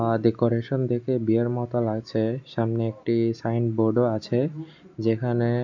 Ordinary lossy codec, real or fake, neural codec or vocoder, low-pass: none; real; none; 7.2 kHz